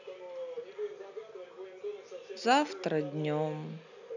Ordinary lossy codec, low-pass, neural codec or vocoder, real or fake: none; 7.2 kHz; none; real